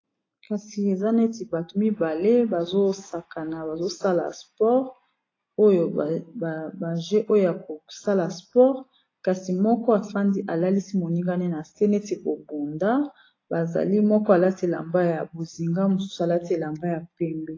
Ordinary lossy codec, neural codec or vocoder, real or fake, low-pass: AAC, 32 kbps; none; real; 7.2 kHz